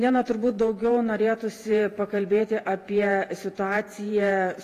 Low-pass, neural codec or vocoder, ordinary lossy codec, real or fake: 14.4 kHz; vocoder, 48 kHz, 128 mel bands, Vocos; AAC, 48 kbps; fake